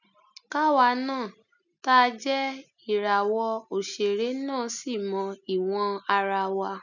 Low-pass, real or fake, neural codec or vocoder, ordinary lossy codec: 7.2 kHz; real; none; none